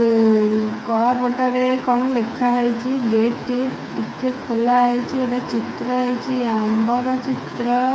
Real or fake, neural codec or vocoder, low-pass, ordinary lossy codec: fake; codec, 16 kHz, 4 kbps, FreqCodec, smaller model; none; none